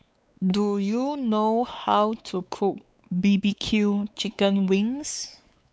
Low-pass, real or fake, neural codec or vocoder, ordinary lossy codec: none; fake; codec, 16 kHz, 4 kbps, X-Codec, WavLM features, trained on Multilingual LibriSpeech; none